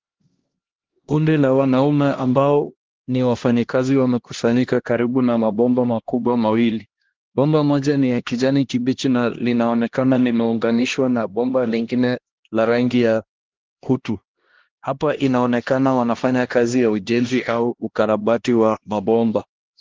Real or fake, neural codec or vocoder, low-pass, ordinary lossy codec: fake; codec, 16 kHz, 1 kbps, X-Codec, HuBERT features, trained on LibriSpeech; 7.2 kHz; Opus, 16 kbps